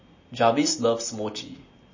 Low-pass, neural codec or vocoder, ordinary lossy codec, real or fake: 7.2 kHz; none; MP3, 32 kbps; real